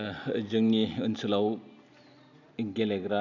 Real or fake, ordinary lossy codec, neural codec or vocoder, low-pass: real; none; none; 7.2 kHz